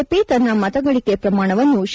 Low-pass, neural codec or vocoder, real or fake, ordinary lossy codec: none; none; real; none